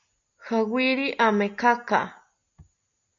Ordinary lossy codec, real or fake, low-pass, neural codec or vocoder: AAC, 64 kbps; real; 7.2 kHz; none